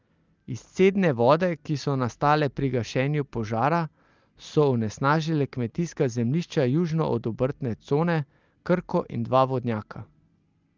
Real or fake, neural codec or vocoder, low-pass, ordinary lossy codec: real; none; 7.2 kHz; Opus, 24 kbps